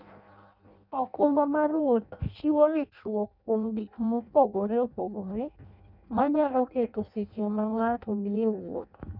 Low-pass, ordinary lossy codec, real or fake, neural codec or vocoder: 5.4 kHz; none; fake; codec, 16 kHz in and 24 kHz out, 0.6 kbps, FireRedTTS-2 codec